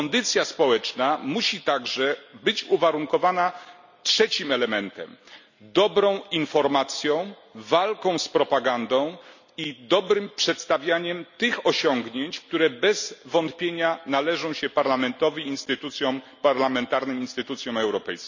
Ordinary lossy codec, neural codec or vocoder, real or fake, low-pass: none; none; real; 7.2 kHz